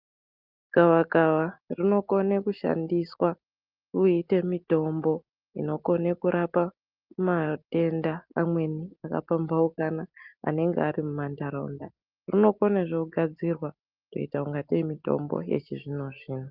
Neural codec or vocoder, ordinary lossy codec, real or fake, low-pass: none; Opus, 32 kbps; real; 5.4 kHz